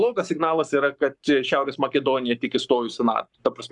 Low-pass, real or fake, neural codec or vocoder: 10.8 kHz; fake; codec, 44.1 kHz, 7.8 kbps, Pupu-Codec